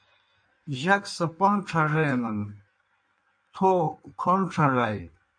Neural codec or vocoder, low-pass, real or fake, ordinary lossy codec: codec, 16 kHz in and 24 kHz out, 1.1 kbps, FireRedTTS-2 codec; 9.9 kHz; fake; MP3, 48 kbps